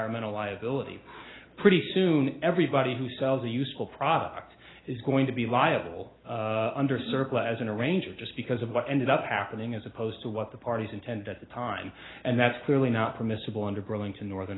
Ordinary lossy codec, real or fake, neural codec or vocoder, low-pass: AAC, 16 kbps; real; none; 7.2 kHz